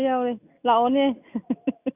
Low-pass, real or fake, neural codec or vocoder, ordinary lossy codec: 3.6 kHz; real; none; none